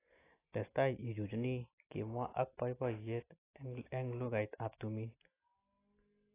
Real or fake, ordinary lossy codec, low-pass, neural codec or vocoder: real; AAC, 16 kbps; 3.6 kHz; none